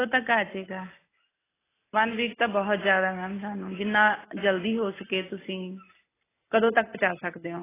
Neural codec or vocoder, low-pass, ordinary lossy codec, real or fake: none; 3.6 kHz; AAC, 16 kbps; real